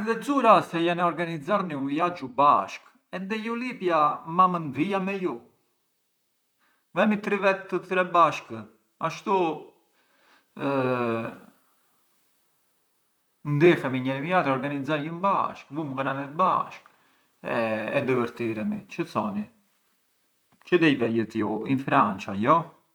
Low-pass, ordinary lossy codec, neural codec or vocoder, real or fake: none; none; vocoder, 44.1 kHz, 128 mel bands, Pupu-Vocoder; fake